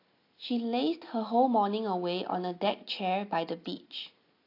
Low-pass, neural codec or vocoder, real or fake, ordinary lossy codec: 5.4 kHz; none; real; AAC, 32 kbps